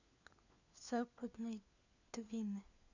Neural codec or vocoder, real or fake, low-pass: codec, 16 kHz, 4 kbps, FunCodec, trained on LibriTTS, 50 frames a second; fake; 7.2 kHz